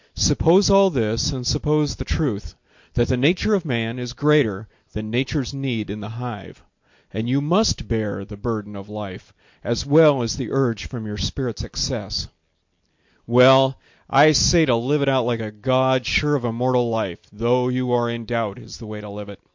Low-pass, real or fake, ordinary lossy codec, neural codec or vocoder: 7.2 kHz; real; MP3, 48 kbps; none